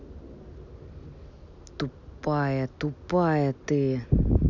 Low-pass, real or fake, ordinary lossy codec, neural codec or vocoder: 7.2 kHz; real; none; none